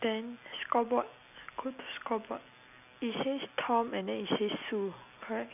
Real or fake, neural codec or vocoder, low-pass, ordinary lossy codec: real; none; 3.6 kHz; none